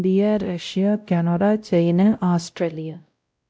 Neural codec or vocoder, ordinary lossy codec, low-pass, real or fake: codec, 16 kHz, 0.5 kbps, X-Codec, WavLM features, trained on Multilingual LibriSpeech; none; none; fake